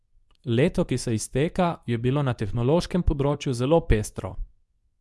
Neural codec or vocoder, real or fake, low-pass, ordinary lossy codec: codec, 24 kHz, 0.9 kbps, WavTokenizer, medium speech release version 2; fake; none; none